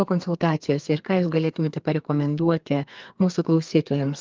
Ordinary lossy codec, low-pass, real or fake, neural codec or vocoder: Opus, 32 kbps; 7.2 kHz; fake; codec, 32 kHz, 1.9 kbps, SNAC